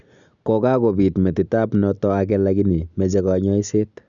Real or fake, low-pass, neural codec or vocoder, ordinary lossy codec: real; 7.2 kHz; none; none